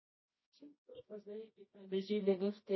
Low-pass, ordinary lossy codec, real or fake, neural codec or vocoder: 7.2 kHz; MP3, 24 kbps; fake; codec, 24 kHz, 0.9 kbps, WavTokenizer, medium music audio release